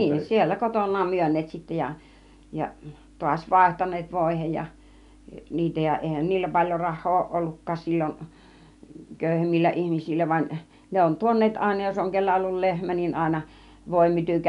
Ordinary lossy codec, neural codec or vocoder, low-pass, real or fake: none; none; 10.8 kHz; real